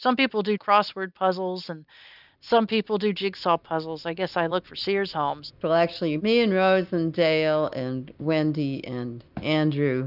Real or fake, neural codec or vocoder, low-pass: real; none; 5.4 kHz